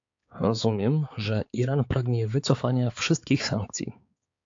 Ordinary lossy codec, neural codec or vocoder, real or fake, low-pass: AAC, 48 kbps; codec, 16 kHz, 4 kbps, X-Codec, HuBERT features, trained on balanced general audio; fake; 7.2 kHz